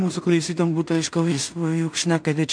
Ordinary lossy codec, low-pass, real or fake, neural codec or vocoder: MP3, 64 kbps; 9.9 kHz; fake; codec, 16 kHz in and 24 kHz out, 0.4 kbps, LongCat-Audio-Codec, two codebook decoder